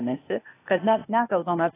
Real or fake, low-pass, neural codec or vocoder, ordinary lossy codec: fake; 3.6 kHz; codec, 16 kHz, 0.8 kbps, ZipCodec; AAC, 16 kbps